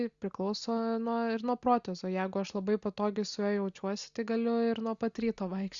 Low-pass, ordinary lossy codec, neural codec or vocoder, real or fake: 7.2 kHz; Opus, 64 kbps; none; real